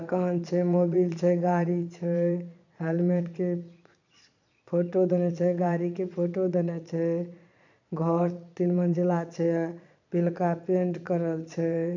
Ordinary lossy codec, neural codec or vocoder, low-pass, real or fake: none; vocoder, 22.05 kHz, 80 mel bands, Vocos; 7.2 kHz; fake